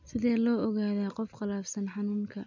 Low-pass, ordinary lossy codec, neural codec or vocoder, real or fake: 7.2 kHz; none; none; real